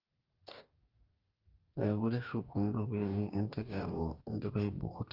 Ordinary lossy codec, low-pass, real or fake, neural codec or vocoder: Opus, 24 kbps; 5.4 kHz; fake; codec, 44.1 kHz, 2.6 kbps, DAC